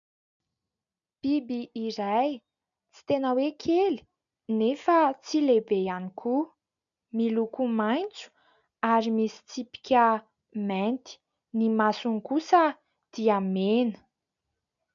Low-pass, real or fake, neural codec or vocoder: 7.2 kHz; real; none